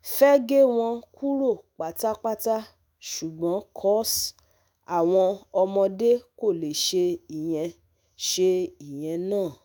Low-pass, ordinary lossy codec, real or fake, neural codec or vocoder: none; none; fake; autoencoder, 48 kHz, 128 numbers a frame, DAC-VAE, trained on Japanese speech